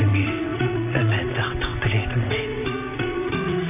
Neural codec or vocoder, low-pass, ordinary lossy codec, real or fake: vocoder, 22.05 kHz, 80 mel bands, WaveNeXt; 3.6 kHz; none; fake